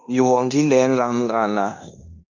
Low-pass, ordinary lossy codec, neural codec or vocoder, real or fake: 7.2 kHz; Opus, 64 kbps; codec, 16 kHz in and 24 kHz out, 0.9 kbps, LongCat-Audio-Codec, fine tuned four codebook decoder; fake